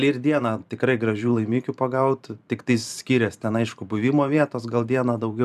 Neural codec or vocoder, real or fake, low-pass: vocoder, 44.1 kHz, 128 mel bands every 512 samples, BigVGAN v2; fake; 14.4 kHz